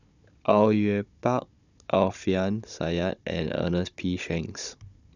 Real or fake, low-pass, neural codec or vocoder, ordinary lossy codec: fake; 7.2 kHz; codec, 16 kHz, 16 kbps, FunCodec, trained on Chinese and English, 50 frames a second; none